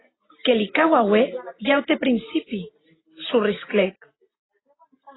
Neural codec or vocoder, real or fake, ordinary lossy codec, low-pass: none; real; AAC, 16 kbps; 7.2 kHz